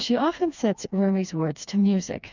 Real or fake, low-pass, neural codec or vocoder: fake; 7.2 kHz; codec, 16 kHz, 2 kbps, FreqCodec, smaller model